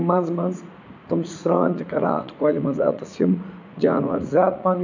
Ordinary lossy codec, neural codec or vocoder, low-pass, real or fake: none; vocoder, 44.1 kHz, 80 mel bands, Vocos; 7.2 kHz; fake